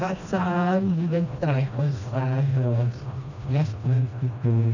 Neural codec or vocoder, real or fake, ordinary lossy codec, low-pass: codec, 16 kHz, 1 kbps, FreqCodec, smaller model; fake; none; 7.2 kHz